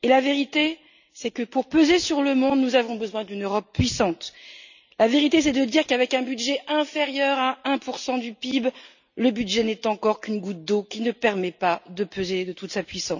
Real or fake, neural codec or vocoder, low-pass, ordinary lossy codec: real; none; 7.2 kHz; none